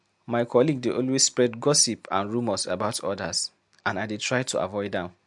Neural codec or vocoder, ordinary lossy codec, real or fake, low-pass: none; MP3, 64 kbps; real; 10.8 kHz